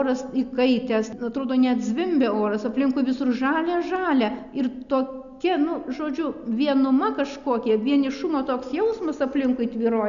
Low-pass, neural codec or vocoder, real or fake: 7.2 kHz; none; real